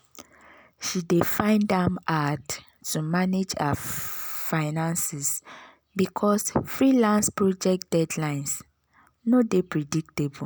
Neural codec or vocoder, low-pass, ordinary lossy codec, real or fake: none; none; none; real